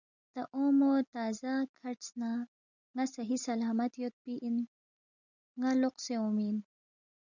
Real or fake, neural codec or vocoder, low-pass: real; none; 7.2 kHz